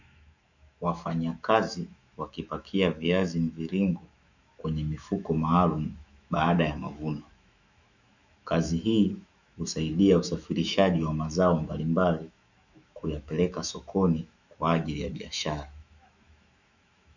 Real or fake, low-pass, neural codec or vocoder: fake; 7.2 kHz; autoencoder, 48 kHz, 128 numbers a frame, DAC-VAE, trained on Japanese speech